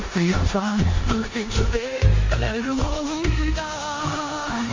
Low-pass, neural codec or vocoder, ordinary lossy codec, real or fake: 7.2 kHz; codec, 16 kHz in and 24 kHz out, 0.9 kbps, LongCat-Audio-Codec, four codebook decoder; MP3, 48 kbps; fake